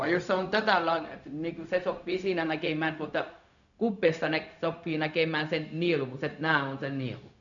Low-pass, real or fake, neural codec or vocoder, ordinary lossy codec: 7.2 kHz; fake; codec, 16 kHz, 0.4 kbps, LongCat-Audio-Codec; none